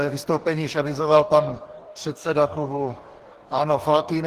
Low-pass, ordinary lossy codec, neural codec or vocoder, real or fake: 14.4 kHz; Opus, 16 kbps; codec, 44.1 kHz, 2.6 kbps, DAC; fake